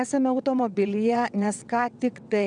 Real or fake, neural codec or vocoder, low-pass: fake; vocoder, 22.05 kHz, 80 mel bands, WaveNeXt; 9.9 kHz